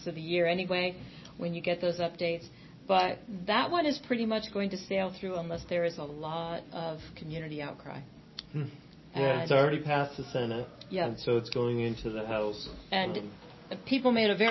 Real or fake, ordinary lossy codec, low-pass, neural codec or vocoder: real; MP3, 24 kbps; 7.2 kHz; none